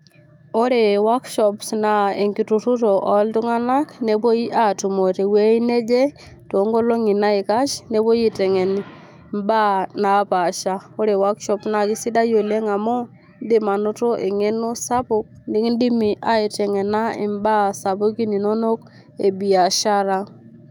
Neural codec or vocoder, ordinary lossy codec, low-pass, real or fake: autoencoder, 48 kHz, 128 numbers a frame, DAC-VAE, trained on Japanese speech; none; 19.8 kHz; fake